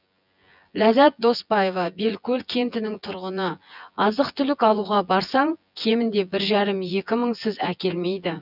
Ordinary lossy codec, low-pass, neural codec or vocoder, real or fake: none; 5.4 kHz; vocoder, 24 kHz, 100 mel bands, Vocos; fake